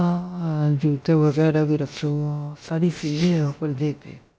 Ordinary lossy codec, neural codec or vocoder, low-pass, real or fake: none; codec, 16 kHz, about 1 kbps, DyCAST, with the encoder's durations; none; fake